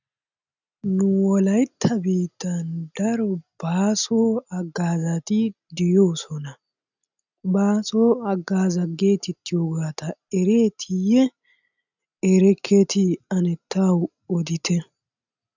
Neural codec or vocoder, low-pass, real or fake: none; 7.2 kHz; real